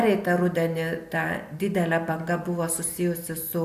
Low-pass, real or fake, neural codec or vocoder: 14.4 kHz; real; none